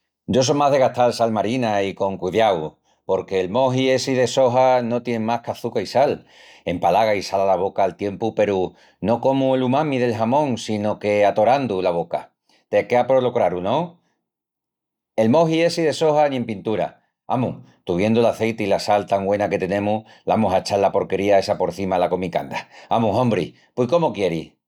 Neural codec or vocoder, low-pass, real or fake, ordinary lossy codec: none; 19.8 kHz; real; none